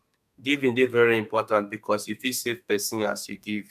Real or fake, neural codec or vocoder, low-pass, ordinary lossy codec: fake; codec, 32 kHz, 1.9 kbps, SNAC; 14.4 kHz; none